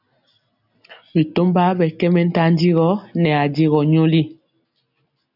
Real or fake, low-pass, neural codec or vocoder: real; 5.4 kHz; none